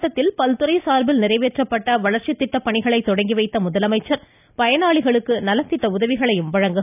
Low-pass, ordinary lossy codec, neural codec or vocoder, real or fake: 3.6 kHz; none; none; real